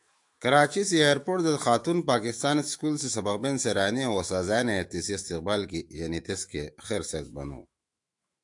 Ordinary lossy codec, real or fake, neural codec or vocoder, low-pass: AAC, 64 kbps; fake; autoencoder, 48 kHz, 128 numbers a frame, DAC-VAE, trained on Japanese speech; 10.8 kHz